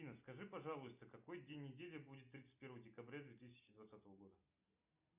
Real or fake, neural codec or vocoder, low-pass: real; none; 3.6 kHz